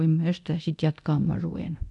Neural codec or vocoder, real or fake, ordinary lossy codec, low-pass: codec, 24 kHz, 0.9 kbps, DualCodec; fake; none; 10.8 kHz